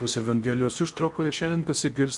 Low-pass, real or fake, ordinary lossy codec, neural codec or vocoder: 10.8 kHz; fake; MP3, 96 kbps; codec, 16 kHz in and 24 kHz out, 0.8 kbps, FocalCodec, streaming, 65536 codes